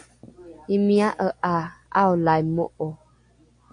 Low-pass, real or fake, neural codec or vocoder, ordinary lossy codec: 9.9 kHz; real; none; AAC, 64 kbps